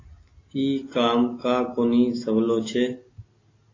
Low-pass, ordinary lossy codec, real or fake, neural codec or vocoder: 7.2 kHz; AAC, 32 kbps; real; none